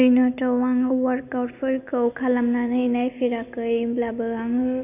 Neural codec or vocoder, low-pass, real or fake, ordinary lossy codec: none; 3.6 kHz; real; none